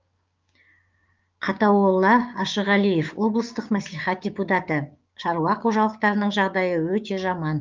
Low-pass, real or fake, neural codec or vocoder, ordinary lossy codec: 7.2 kHz; fake; codec, 16 kHz, 6 kbps, DAC; Opus, 24 kbps